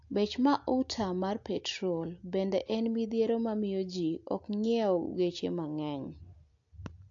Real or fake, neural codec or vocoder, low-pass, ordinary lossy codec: real; none; 7.2 kHz; AAC, 48 kbps